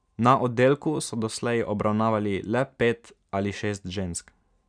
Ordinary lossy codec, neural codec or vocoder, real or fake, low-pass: none; none; real; 9.9 kHz